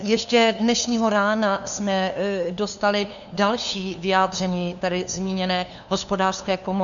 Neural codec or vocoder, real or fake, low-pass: codec, 16 kHz, 2 kbps, FunCodec, trained on LibriTTS, 25 frames a second; fake; 7.2 kHz